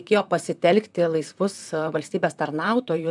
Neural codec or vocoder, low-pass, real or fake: vocoder, 44.1 kHz, 128 mel bands, Pupu-Vocoder; 10.8 kHz; fake